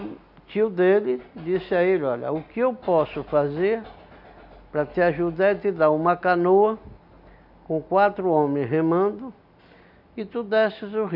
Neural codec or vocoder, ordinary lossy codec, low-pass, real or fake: none; none; 5.4 kHz; real